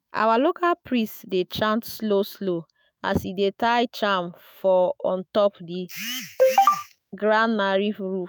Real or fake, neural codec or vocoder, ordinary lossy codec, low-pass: fake; autoencoder, 48 kHz, 128 numbers a frame, DAC-VAE, trained on Japanese speech; none; none